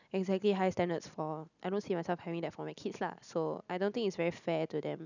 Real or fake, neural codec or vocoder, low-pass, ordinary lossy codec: real; none; 7.2 kHz; none